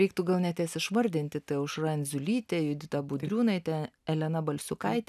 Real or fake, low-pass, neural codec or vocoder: real; 14.4 kHz; none